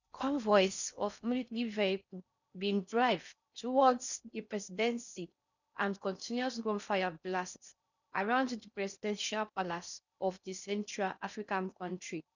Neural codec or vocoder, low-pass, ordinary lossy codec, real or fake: codec, 16 kHz in and 24 kHz out, 0.6 kbps, FocalCodec, streaming, 4096 codes; 7.2 kHz; none; fake